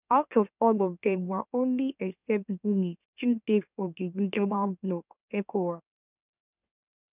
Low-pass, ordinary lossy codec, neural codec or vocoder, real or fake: 3.6 kHz; none; autoencoder, 44.1 kHz, a latent of 192 numbers a frame, MeloTTS; fake